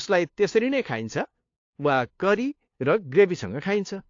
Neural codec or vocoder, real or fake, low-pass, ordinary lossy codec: codec, 16 kHz, 2 kbps, FunCodec, trained on LibriTTS, 25 frames a second; fake; 7.2 kHz; AAC, 48 kbps